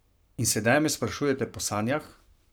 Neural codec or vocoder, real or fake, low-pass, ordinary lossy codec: codec, 44.1 kHz, 7.8 kbps, Pupu-Codec; fake; none; none